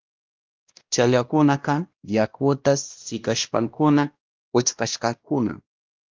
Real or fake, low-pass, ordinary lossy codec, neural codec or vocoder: fake; 7.2 kHz; Opus, 32 kbps; codec, 16 kHz, 1 kbps, X-Codec, WavLM features, trained on Multilingual LibriSpeech